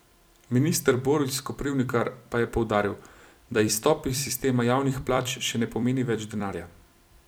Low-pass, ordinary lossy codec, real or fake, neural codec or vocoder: none; none; fake; vocoder, 44.1 kHz, 128 mel bands every 256 samples, BigVGAN v2